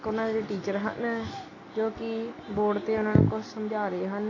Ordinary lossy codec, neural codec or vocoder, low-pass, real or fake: AAC, 32 kbps; none; 7.2 kHz; real